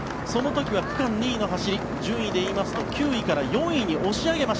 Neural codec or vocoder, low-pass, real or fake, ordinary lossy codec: none; none; real; none